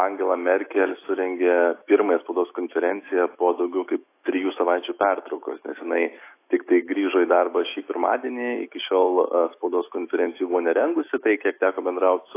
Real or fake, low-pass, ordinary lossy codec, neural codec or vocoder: fake; 3.6 kHz; AAC, 24 kbps; autoencoder, 48 kHz, 128 numbers a frame, DAC-VAE, trained on Japanese speech